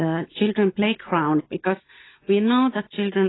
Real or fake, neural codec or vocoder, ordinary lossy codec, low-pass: real; none; AAC, 16 kbps; 7.2 kHz